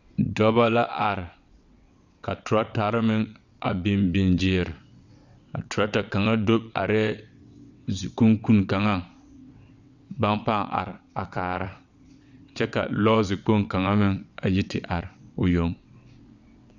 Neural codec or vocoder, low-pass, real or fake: vocoder, 22.05 kHz, 80 mel bands, WaveNeXt; 7.2 kHz; fake